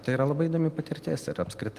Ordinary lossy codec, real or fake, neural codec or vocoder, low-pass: Opus, 24 kbps; real; none; 14.4 kHz